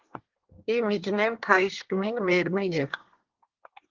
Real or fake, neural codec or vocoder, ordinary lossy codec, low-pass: fake; codec, 24 kHz, 1 kbps, SNAC; Opus, 16 kbps; 7.2 kHz